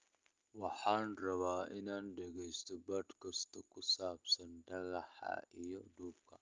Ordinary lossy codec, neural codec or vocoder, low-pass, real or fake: Opus, 24 kbps; none; 7.2 kHz; real